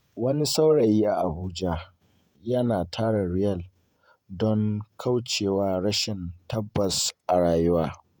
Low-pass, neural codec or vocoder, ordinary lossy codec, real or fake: none; vocoder, 48 kHz, 128 mel bands, Vocos; none; fake